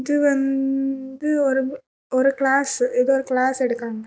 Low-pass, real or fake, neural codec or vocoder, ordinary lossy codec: none; real; none; none